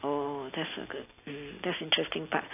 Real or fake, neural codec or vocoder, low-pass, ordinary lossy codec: real; none; 3.6 kHz; AAC, 24 kbps